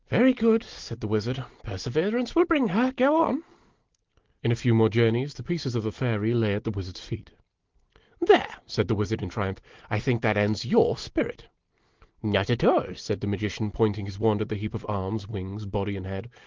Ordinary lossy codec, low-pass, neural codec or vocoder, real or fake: Opus, 16 kbps; 7.2 kHz; none; real